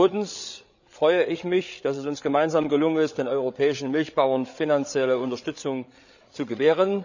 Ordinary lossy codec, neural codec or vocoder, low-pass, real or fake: none; codec, 16 kHz, 8 kbps, FreqCodec, larger model; 7.2 kHz; fake